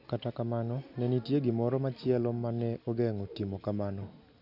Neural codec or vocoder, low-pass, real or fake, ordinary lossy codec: none; 5.4 kHz; real; none